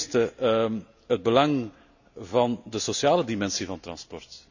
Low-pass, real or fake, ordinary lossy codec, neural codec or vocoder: 7.2 kHz; real; none; none